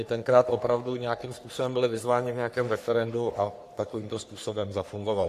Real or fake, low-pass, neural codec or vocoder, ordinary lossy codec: fake; 14.4 kHz; codec, 44.1 kHz, 3.4 kbps, Pupu-Codec; AAC, 64 kbps